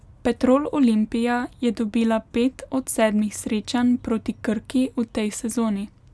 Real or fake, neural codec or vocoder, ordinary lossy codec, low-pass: real; none; none; none